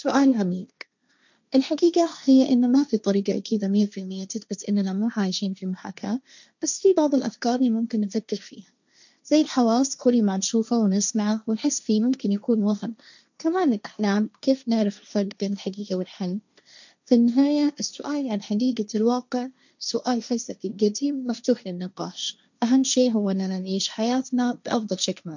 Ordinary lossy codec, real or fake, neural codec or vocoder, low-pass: none; fake; codec, 16 kHz, 1.1 kbps, Voila-Tokenizer; 7.2 kHz